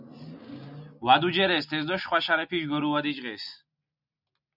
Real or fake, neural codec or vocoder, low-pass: real; none; 5.4 kHz